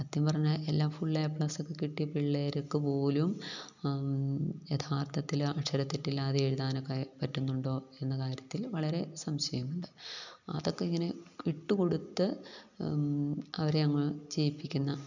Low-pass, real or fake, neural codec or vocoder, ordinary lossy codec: 7.2 kHz; real; none; none